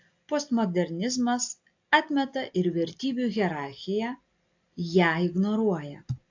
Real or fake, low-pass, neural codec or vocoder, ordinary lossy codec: real; 7.2 kHz; none; AAC, 48 kbps